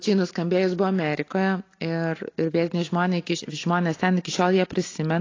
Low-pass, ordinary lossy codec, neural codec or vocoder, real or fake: 7.2 kHz; AAC, 32 kbps; none; real